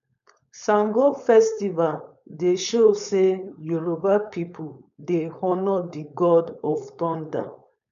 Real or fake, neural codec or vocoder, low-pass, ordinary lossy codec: fake; codec, 16 kHz, 4.8 kbps, FACodec; 7.2 kHz; none